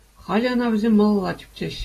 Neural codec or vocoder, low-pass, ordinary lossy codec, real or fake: none; 14.4 kHz; AAC, 48 kbps; real